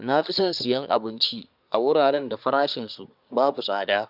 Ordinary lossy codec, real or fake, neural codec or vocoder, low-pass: none; fake; codec, 24 kHz, 1 kbps, SNAC; 5.4 kHz